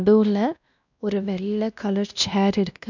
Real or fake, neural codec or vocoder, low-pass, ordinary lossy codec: fake; codec, 16 kHz, 1 kbps, X-Codec, WavLM features, trained on Multilingual LibriSpeech; 7.2 kHz; none